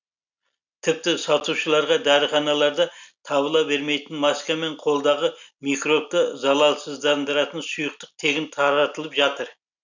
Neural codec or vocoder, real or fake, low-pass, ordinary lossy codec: none; real; 7.2 kHz; none